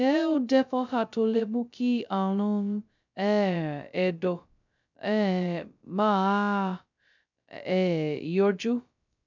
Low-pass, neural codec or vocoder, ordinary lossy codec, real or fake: 7.2 kHz; codec, 16 kHz, 0.2 kbps, FocalCodec; none; fake